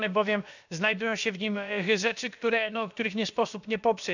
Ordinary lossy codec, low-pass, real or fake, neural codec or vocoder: none; 7.2 kHz; fake; codec, 16 kHz, about 1 kbps, DyCAST, with the encoder's durations